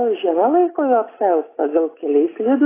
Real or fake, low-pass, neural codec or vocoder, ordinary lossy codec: real; 3.6 kHz; none; AAC, 16 kbps